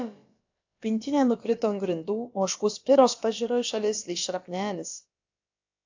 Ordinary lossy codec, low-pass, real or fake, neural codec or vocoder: AAC, 48 kbps; 7.2 kHz; fake; codec, 16 kHz, about 1 kbps, DyCAST, with the encoder's durations